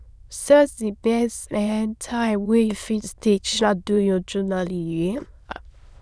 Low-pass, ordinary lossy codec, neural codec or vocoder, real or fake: none; none; autoencoder, 22.05 kHz, a latent of 192 numbers a frame, VITS, trained on many speakers; fake